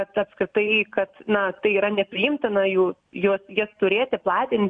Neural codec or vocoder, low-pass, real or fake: none; 9.9 kHz; real